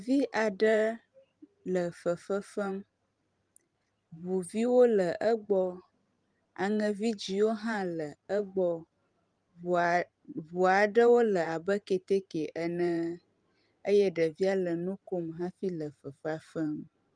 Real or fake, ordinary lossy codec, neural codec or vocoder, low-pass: fake; Opus, 32 kbps; vocoder, 44.1 kHz, 128 mel bands every 512 samples, BigVGAN v2; 9.9 kHz